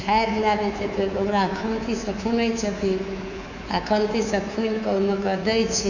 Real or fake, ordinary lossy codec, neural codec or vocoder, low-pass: fake; none; codec, 24 kHz, 3.1 kbps, DualCodec; 7.2 kHz